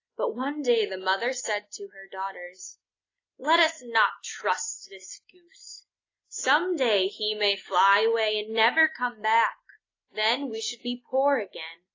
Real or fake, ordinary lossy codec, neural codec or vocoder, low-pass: real; AAC, 32 kbps; none; 7.2 kHz